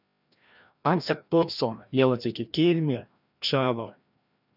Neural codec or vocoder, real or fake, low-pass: codec, 16 kHz, 1 kbps, FreqCodec, larger model; fake; 5.4 kHz